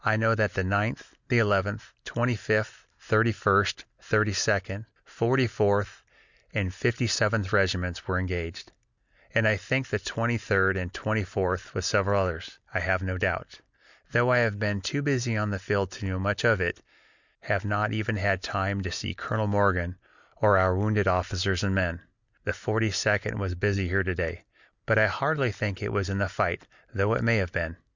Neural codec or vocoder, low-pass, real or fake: none; 7.2 kHz; real